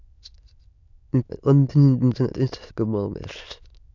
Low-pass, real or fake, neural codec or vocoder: 7.2 kHz; fake; autoencoder, 22.05 kHz, a latent of 192 numbers a frame, VITS, trained on many speakers